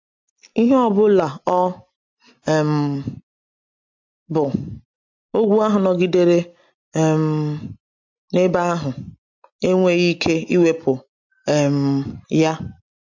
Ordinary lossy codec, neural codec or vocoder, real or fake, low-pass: MP3, 48 kbps; none; real; 7.2 kHz